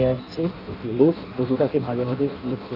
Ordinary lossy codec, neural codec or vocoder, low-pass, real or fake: none; codec, 16 kHz in and 24 kHz out, 0.6 kbps, FireRedTTS-2 codec; 5.4 kHz; fake